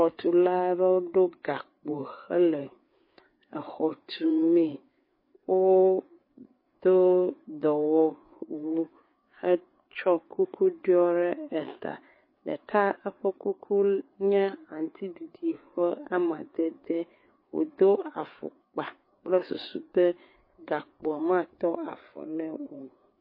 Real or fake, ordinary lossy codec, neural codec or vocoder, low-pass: fake; MP3, 24 kbps; codec, 16 kHz, 4 kbps, FreqCodec, larger model; 5.4 kHz